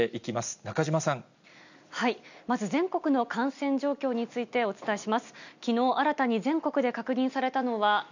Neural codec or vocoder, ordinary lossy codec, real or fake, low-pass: none; none; real; 7.2 kHz